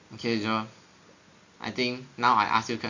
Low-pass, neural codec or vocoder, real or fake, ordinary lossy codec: 7.2 kHz; none; real; AAC, 48 kbps